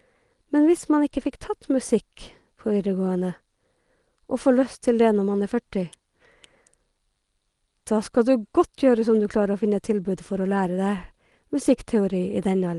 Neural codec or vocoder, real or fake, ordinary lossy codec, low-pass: none; real; Opus, 24 kbps; 10.8 kHz